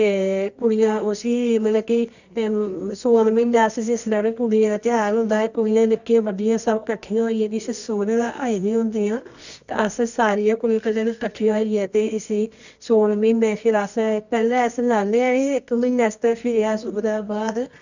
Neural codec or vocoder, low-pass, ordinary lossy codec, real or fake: codec, 24 kHz, 0.9 kbps, WavTokenizer, medium music audio release; 7.2 kHz; none; fake